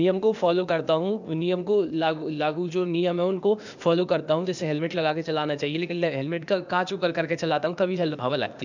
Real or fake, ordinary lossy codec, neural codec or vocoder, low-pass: fake; none; codec, 16 kHz, 0.8 kbps, ZipCodec; 7.2 kHz